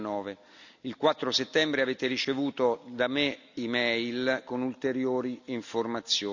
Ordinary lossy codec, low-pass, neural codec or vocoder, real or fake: none; 7.2 kHz; none; real